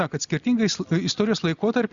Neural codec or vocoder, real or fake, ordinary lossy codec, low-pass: none; real; Opus, 64 kbps; 7.2 kHz